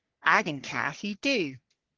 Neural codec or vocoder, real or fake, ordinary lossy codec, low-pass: codec, 44.1 kHz, 3.4 kbps, Pupu-Codec; fake; Opus, 16 kbps; 7.2 kHz